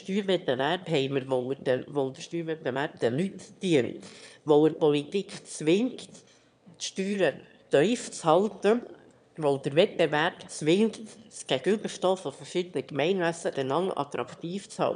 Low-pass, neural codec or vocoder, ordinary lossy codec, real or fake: 9.9 kHz; autoencoder, 22.05 kHz, a latent of 192 numbers a frame, VITS, trained on one speaker; none; fake